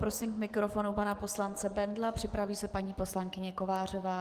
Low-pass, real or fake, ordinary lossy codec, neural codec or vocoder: 14.4 kHz; fake; Opus, 24 kbps; codec, 44.1 kHz, 7.8 kbps, DAC